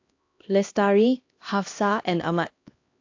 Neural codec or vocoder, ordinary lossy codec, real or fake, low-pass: codec, 16 kHz, 1 kbps, X-Codec, HuBERT features, trained on LibriSpeech; AAC, 48 kbps; fake; 7.2 kHz